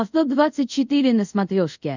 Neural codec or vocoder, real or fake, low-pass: codec, 24 kHz, 0.5 kbps, DualCodec; fake; 7.2 kHz